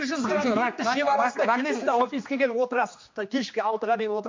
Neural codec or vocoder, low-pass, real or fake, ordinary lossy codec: codec, 16 kHz, 2 kbps, X-Codec, HuBERT features, trained on general audio; 7.2 kHz; fake; MP3, 48 kbps